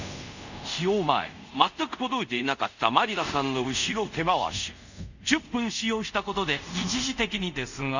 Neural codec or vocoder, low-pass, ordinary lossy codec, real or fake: codec, 24 kHz, 0.5 kbps, DualCodec; 7.2 kHz; none; fake